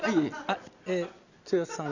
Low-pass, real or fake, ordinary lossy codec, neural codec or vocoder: 7.2 kHz; real; none; none